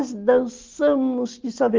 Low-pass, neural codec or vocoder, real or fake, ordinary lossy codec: 7.2 kHz; none; real; Opus, 32 kbps